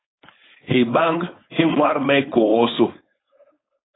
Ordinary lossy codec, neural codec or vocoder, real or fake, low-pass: AAC, 16 kbps; codec, 16 kHz, 4.8 kbps, FACodec; fake; 7.2 kHz